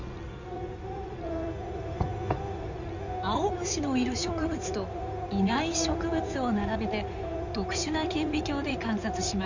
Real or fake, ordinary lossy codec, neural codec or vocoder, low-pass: fake; none; codec, 16 kHz in and 24 kHz out, 2.2 kbps, FireRedTTS-2 codec; 7.2 kHz